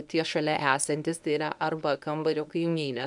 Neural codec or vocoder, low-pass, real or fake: codec, 24 kHz, 0.9 kbps, WavTokenizer, medium speech release version 1; 10.8 kHz; fake